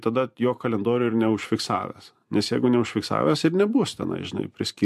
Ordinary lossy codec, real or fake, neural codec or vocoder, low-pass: MP3, 64 kbps; fake; vocoder, 44.1 kHz, 128 mel bands every 512 samples, BigVGAN v2; 14.4 kHz